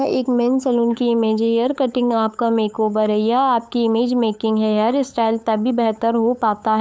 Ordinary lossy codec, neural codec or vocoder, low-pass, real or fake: none; codec, 16 kHz, 8 kbps, FunCodec, trained on LibriTTS, 25 frames a second; none; fake